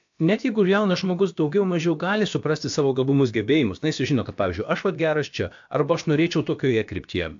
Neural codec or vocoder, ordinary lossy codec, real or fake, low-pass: codec, 16 kHz, about 1 kbps, DyCAST, with the encoder's durations; AAC, 64 kbps; fake; 7.2 kHz